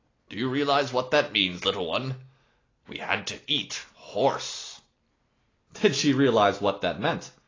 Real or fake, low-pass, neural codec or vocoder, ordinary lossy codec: real; 7.2 kHz; none; AAC, 32 kbps